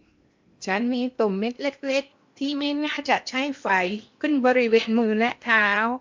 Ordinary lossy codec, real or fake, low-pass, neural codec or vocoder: MP3, 64 kbps; fake; 7.2 kHz; codec, 16 kHz in and 24 kHz out, 0.8 kbps, FocalCodec, streaming, 65536 codes